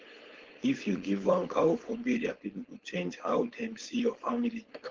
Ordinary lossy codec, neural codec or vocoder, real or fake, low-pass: Opus, 16 kbps; codec, 16 kHz, 4.8 kbps, FACodec; fake; 7.2 kHz